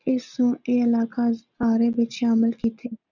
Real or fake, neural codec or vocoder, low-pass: real; none; 7.2 kHz